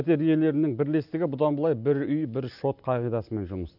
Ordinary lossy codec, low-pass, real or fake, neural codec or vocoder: none; 5.4 kHz; real; none